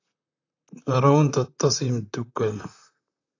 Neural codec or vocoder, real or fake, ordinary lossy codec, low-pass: vocoder, 44.1 kHz, 128 mel bands, Pupu-Vocoder; fake; AAC, 48 kbps; 7.2 kHz